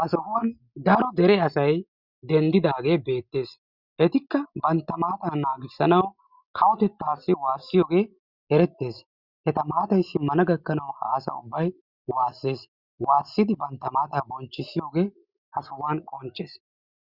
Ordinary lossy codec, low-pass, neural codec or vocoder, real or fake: Opus, 64 kbps; 5.4 kHz; none; real